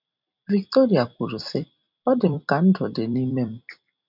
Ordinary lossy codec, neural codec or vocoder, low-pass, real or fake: none; vocoder, 44.1 kHz, 128 mel bands every 256 samples, BigVGAN v2; 5.4 kHz; fake